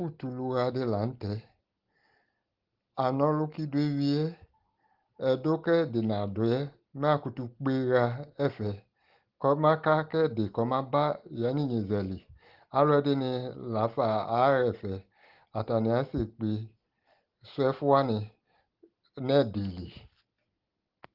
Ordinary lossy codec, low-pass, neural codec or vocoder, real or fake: Opus, 16 kbps; 5.4 kHz; none; real